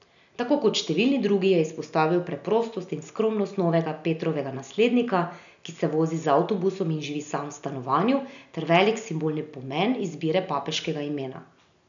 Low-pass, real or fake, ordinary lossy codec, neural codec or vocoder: 7.2 kHz; real; none; none